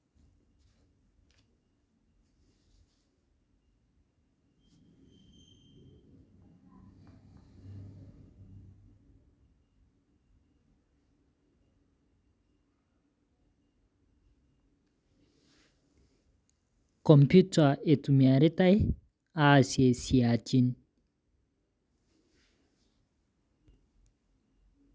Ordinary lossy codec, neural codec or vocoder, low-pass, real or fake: none; none; none; real